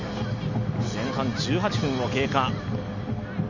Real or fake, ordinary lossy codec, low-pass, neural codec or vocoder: real; none; 7.2 kHz; none